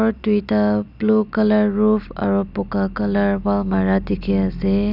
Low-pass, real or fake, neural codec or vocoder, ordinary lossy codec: 5.4 kHz; real; none; none